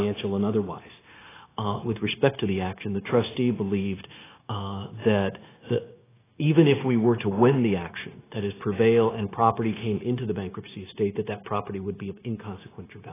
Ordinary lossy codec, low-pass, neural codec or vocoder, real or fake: AAC, 16 kbps; 3.6 kHz; codec, 16 kHz, 0.9 kbps, LongCat-Audio-Codec; fake